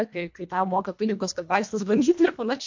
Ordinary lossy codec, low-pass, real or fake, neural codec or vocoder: MP3, 48 kbps; 7.2 kHz; fake; codec, 24 kHz, 1.5 kbps, HILCodec